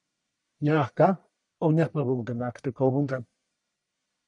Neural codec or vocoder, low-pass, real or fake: codec, 44.1 kHz, 1.7 kbps, Pupu-Codec; 10.8 kHz; fake